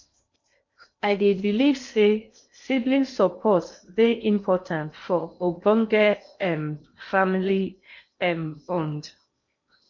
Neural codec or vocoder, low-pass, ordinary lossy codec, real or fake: codec, 16 kHz in and 24 kHz out, 0.8 kbps, FocalCodec, streaming, 65536 codes; 7.2 kHz; MP3, 48 kbps; fake